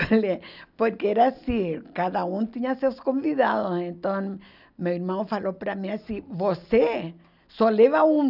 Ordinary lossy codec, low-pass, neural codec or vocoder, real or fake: none; 5.4 kHz; none; real